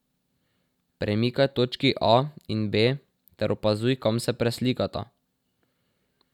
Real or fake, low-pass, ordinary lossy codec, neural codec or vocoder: real; 19.8 kHz; none; none